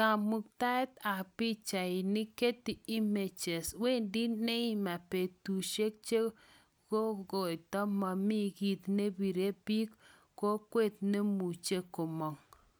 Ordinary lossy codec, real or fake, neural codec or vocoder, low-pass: none; real; none; none